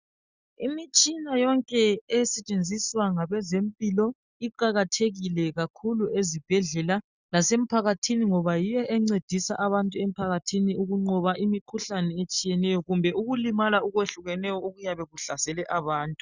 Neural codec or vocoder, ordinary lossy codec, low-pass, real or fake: none; Opus, 64 kbps; 7.2 kHz; real